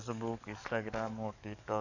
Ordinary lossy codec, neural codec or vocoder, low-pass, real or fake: none; codec, 44.1 kHz, 7.8 kbps, DAC; 7.2 kHz; fake